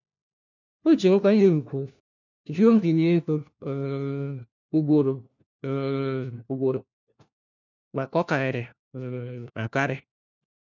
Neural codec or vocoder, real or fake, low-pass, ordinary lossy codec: codec, 16 kHz, 1 kbps, FunCodec, trained on LibriTTS, 50 frames a second; fake; 7.2 kHz; none